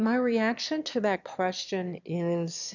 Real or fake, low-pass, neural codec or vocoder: fake; 7.2 kHz; autoencoder, 22.05 kHz, a latent of 192 numbers a frame, VITS, trained on one speaker